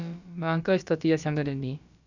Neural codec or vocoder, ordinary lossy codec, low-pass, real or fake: codec, 16 kHz, about 1 kbps, DyCAST, with the encoder's durations; none; 7.2 kHz; fake